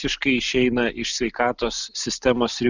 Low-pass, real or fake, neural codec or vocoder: 7.2 kHz; real; none